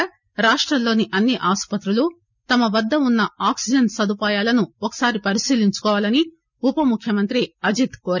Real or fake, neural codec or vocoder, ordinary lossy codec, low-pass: real; none; none; 7.2 kHz